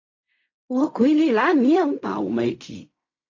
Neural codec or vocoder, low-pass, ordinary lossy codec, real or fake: codec, 16 kHz in and 24 kHz out, 0.4 kbps, LongCat-Audio-Codec, fine tuned four codebook decoder; 7.2 kHz; AAC, 48 kbps; fake